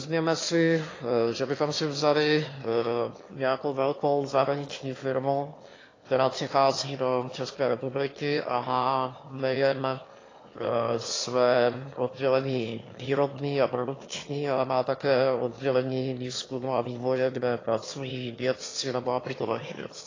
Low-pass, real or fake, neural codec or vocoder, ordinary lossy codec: 7.2 kHz; fake; autoencoder, 22.05 kHz, a latent of 192 numbers a frame, VITS, trained on one speaker; AAC, 32 kbps